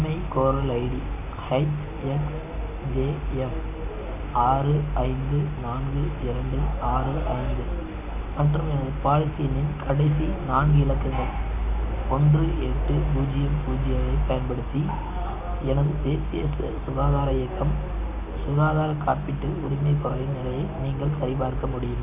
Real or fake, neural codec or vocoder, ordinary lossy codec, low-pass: real; none; none; 3.6 kHz